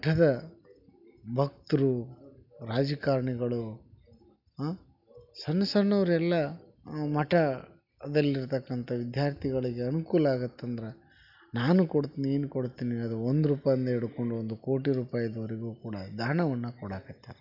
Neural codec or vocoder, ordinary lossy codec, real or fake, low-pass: none; none; real; 5.4 kHz